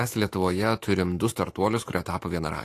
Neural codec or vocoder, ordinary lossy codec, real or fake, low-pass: autoencoder, 48 kHz, 128 numbers a frame, DAC-VAE, trained on Japanese speech; AAC, 48 kbps; fake; 14.4 kHz